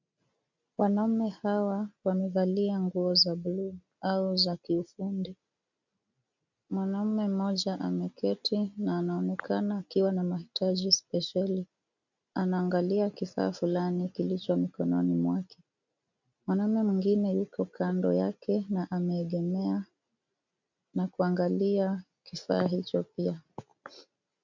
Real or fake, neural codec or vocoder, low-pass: real; none; 7.2 kHz